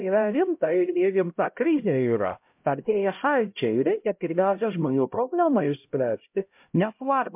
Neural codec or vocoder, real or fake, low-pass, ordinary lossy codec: codec, 16 kHz, 0.5 kbps, X-Codec, HuBERT features, trained on LibriSpeech; fake; 3.6 kHz; MP3, 32 kbps